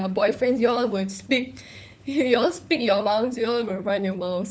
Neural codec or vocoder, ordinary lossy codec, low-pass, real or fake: codec, 16 kHz, 4 kbps, FunCodec, trained on LibriTTS, 50 frames a second; none; none; fake